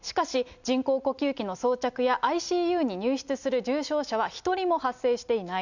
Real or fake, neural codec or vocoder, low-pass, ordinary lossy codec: real; none; 7.2 kHz; none